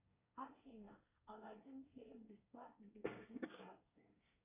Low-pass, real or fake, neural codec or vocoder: 3.6 kHz; fake; codec, 24 kHz, 1 kbps, SNAC